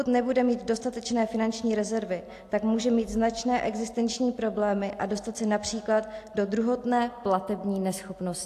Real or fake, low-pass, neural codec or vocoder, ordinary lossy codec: real; 14.4 kHz; none; AAC, 64 kbps